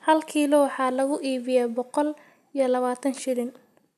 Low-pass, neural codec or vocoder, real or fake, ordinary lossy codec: 14.4 kHz; none; real; none